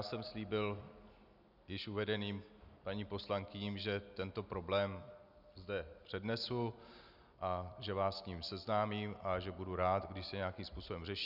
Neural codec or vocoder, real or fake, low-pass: none; real; 5.4 kHz